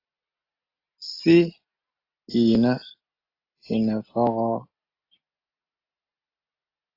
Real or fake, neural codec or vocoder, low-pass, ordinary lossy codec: real; none; 5.4 kHz; AAC, 32 kbps